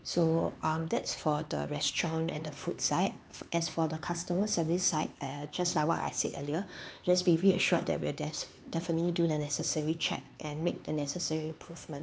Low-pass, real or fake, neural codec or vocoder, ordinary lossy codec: none; fake; codec, 16 kHz, 4 kbps, X-Codec, HuBERT features, trained on LibriSpeech; none